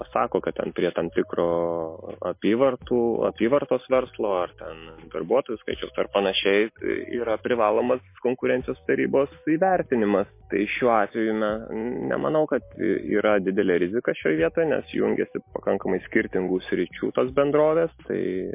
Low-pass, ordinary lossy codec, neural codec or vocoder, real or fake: 3.6 kHz; MP3, 24 kbps; none; real